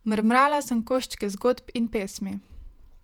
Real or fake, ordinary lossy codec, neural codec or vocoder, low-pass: fake; none; vocoder, 48 kHz, 128 mel bands, Vocos; 19.8 kHz